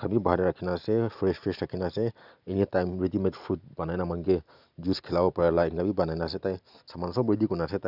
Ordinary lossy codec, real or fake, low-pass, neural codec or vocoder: none; real; 5.4 kHz; none